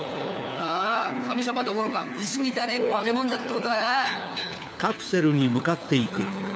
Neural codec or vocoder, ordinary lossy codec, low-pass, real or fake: codec, 16 kHz, 4 kbps, FunCodec, trained on LibriTTS, 50 frames a second; none; none; fake